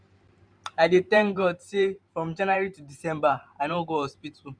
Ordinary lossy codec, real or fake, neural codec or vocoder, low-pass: MP3, 96 kbps; fake; vocoder, 44.1 kHz, 128 mel bands every 512 samples, BigVGAN v2; 9.9 kHz